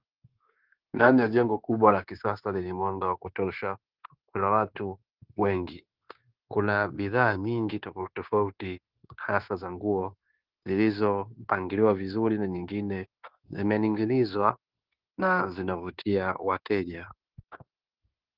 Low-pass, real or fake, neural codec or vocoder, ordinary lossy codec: 5.4 kHz; fake; codec, 16 kHz, 0.9 kbps, LongCat-Audio-Codec; Opus, 16 kbps